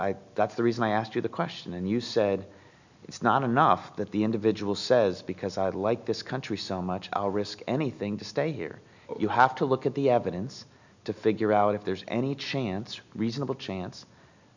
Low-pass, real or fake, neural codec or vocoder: 7.2 kHz; real; none